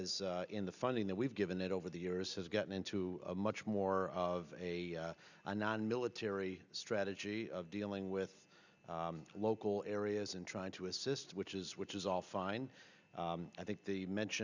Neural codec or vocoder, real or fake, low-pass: none; real; 7.2 kHz